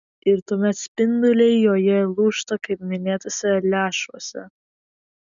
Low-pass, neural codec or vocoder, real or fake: 7.2 kHz; none; real